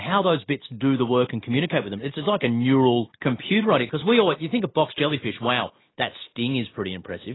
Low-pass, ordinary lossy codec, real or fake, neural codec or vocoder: 7.2 kHz; AAC, 16 kbps; real; none